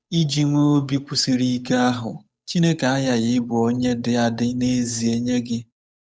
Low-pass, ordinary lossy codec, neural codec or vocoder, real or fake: none; none; codec, 16 kHz, 8 kbps, FunCodec, trained on Chinese and English, 25 frames a second; fake